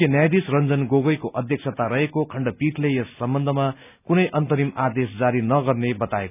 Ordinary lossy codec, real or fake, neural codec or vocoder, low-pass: none; real; none; 3.6 kHz